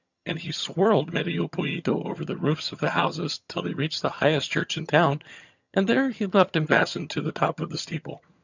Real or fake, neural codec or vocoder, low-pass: fake; vocoder, 22.05 kHz, 80 mel bands, HiFi-GAN; 7.2 kHz